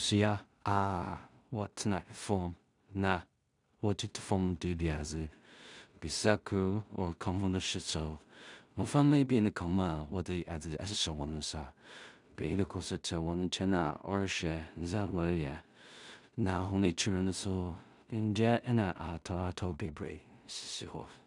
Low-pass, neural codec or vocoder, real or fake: 10.8 kHz; codec, 16 kHz in and 24 kHz out, 0.4 kbps, LongCat-Audio-Codec, two codebook decoder; fake